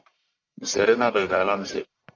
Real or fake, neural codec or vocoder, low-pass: fake; codec, 44.1 kHz, 1.7 kbps, Pupu-Codec; 7.2 kHz